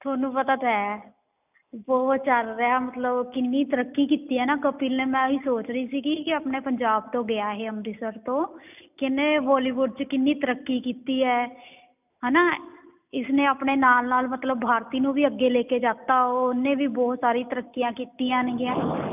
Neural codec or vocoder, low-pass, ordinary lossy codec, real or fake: none; 3.6 kHz; none; real